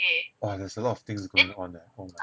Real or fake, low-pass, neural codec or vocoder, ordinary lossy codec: real; none; none; none